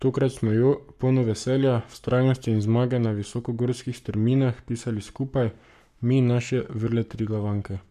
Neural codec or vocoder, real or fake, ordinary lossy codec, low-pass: codec, 44.1 kHz, 7.8 kbps, Pupu-Codec; fake; none; 14.4 kHz